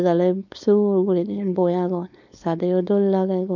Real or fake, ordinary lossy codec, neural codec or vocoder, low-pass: fake; none; codec, 16 kHz, 4.8 kbps, FACodec; 7.2 kHz